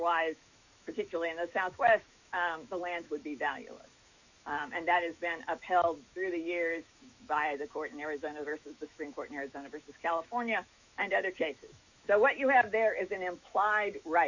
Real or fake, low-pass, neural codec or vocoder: fake; 7.2 kHz; autoencoder, 48 kHz, 128 numbers a frame, DAC-VAE, trained on Japanese speech